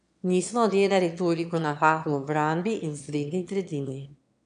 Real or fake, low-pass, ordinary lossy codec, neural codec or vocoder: fake; 9.9 kHz; none; autoencoder, 22.05 kHz, a latent of 192 numbers a frame, VITS, trained on one speaker